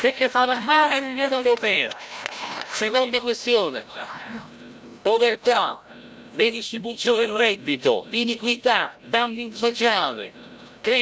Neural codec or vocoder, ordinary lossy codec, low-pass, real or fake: codec, 16 kHz, 0.5 kbps, FreqCodec, larger model; none; none; fake